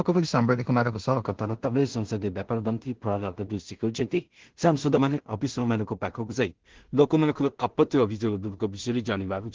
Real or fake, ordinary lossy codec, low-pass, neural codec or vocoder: fake; Opus, 16 kbps; 7.2 kHz; codec, 16 kHz in and 24 kHz out, 0.4 kbps, LongCat-Audio-Codec, two codebook decoder